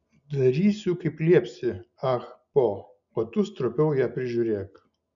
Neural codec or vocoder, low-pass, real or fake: none; 7.2 kHz; real